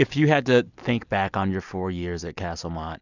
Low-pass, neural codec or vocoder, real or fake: 7.2 kHz; none; real